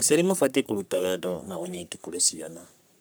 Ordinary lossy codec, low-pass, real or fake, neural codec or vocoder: none; none; fake; codec, 44.1 kHz, 3.4 kbps, Pupu-Codec